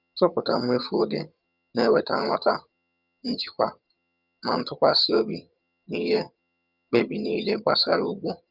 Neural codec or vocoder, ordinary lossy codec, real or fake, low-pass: vocoder, 22.05 kHz, 80 mel bands, HiFi-GAN; Opus, 64 kbps; fake; 5.4 kHz